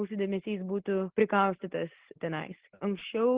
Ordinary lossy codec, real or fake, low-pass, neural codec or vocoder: Opus, 16 kbps; real; 3.6 kHz; none